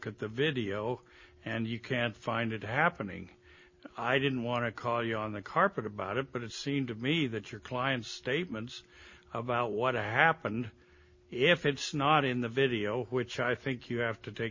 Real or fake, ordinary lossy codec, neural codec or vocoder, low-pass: real; MP3, 32 kbps; none; 7.2 kHz